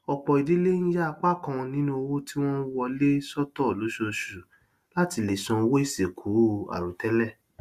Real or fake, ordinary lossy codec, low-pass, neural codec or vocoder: real; Opus, 64 kbps; 14.4 kHz; none